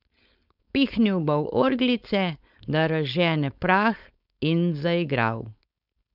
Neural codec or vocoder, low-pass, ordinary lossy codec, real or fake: codec, 16 kHz, 4.8 kbps, FACodec; 5.4 kHz; none; fake